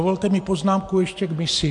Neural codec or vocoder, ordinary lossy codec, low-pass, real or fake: none; MP3, 64 kbps; 10.8 kHz; real